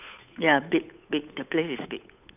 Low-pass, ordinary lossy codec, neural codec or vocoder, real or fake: 3.6 kHz; none; codec, 16 kHz, 8 kbps, FunCodec, trained on Chinese and English, 25 frames a second; fake